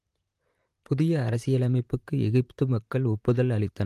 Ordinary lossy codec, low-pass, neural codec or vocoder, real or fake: Opus, 32 kbps; 14.4 kHz; vocoder, 44.1 kHz, 128 mel bands, Pupu-Vocoder; fake